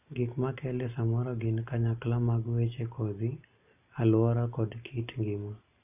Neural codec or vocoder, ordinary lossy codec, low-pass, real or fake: none; none; 3.6 kHz; real